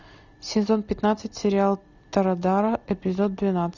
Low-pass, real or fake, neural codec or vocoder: 7.2 kHz; real; none